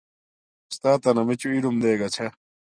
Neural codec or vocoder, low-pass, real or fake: none; 9.9 kHz; real